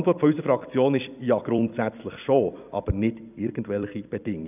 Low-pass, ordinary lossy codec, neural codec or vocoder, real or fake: 3.6 kHz; none; vocoder, 44.1 kHz, 128 mel bands every 256 samples, BigVGAN v2; fake